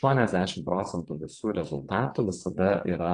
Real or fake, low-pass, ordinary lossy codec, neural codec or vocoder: fake; 9.9 kHz; AAC, 48 kbps; vocoder, 22.05 kHz, 80 mel bands, WaveNeXt